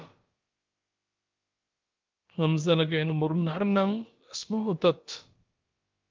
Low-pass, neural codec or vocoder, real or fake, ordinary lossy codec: 7.2 kHz; codec, 16 kHz, about 1 kbps, DyCAST, with the encoder's durations; fake; Opus, 32 kbps